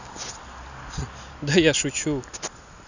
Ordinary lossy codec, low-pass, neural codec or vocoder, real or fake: none; 7.2 kHz; none; real